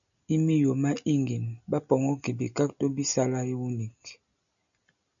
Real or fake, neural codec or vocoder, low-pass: real; none; 7.2 kHz